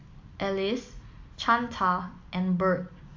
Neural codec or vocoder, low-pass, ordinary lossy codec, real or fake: none; 7.2 kHz; AAC, 48 kbps; real